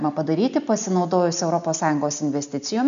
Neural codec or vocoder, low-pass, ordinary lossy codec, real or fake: none; 7.2 kHz; AAC, 96 kbps; real